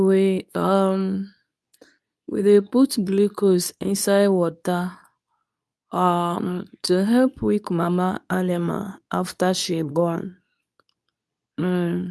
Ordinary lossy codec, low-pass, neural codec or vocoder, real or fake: none; none; codec, 24 kHz, 0.9 kbps, WavTokenizer, medium speech release version 2; fake